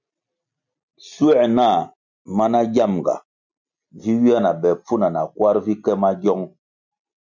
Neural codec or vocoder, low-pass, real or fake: none; 7.2 kHz; real